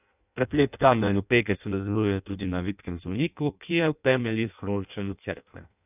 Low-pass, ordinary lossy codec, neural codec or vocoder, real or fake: 3.6 kHz; none; codec, 16 kHz in and 24 kHz out, 0.6 kbps, FireRedTTS-2 codec; fake